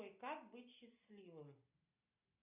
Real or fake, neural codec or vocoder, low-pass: real; none; 3.6 kHz